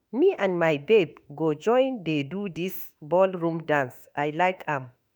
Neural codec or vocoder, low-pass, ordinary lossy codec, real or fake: autoencoder, 48 kHz, 32 numbers a frame, DAC-VAE, trained on Japanese speech; none; none; fake